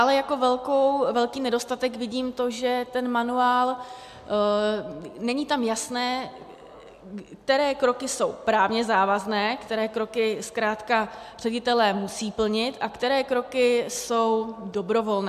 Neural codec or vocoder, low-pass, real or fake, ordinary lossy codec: none; 14.4 kHz; real; AAC, 96 kbps